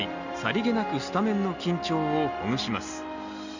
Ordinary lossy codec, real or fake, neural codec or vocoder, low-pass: none; real; none; 7.2 kHz